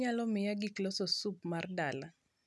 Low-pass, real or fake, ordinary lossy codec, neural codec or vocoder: none; real; none; none